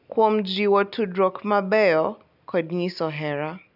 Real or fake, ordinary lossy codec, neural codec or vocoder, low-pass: real; none; none; 5.4 kHz